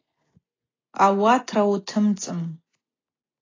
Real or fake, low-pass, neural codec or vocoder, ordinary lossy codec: real; 7.2 kHz; none; AAC, 32 kbps